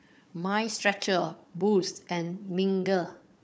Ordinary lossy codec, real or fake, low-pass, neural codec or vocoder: none; fake; none; codec, 16 kHz, 4 kbps, FunCodec, trained on Chinese and English, 50 frames a second